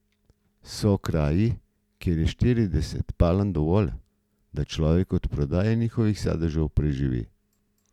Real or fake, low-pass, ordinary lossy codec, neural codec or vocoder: real; 19.8 kHz; none; none